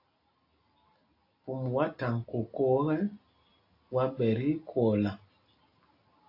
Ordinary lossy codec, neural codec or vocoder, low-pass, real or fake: AAC, 32 kbps; none; 5.4 kHz; real